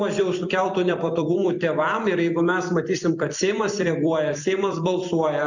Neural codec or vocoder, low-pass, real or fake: vocoder, 44.1 kHz, 128 mel bands every 256 samples, BigVGAN v2; 7.2 kHz; fake